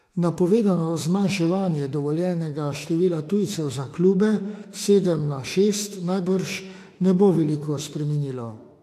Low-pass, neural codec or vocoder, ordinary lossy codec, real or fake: 14.4 kHz; autoencoder, 48 kHz, 32 numbers a frame, DAC-VAE, trained on Japanese speech; AAC, 64 kbps; fake